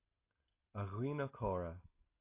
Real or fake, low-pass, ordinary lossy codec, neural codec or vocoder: real; 3.6 kHz; AAC, 24 kbps; none